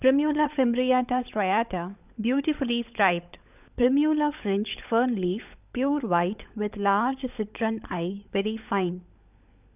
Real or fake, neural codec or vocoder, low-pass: fake; codec, 16 kHz, 16 kbps, FunCodec, trained on LibriTTS, 50 frames a second; 3.6 kHz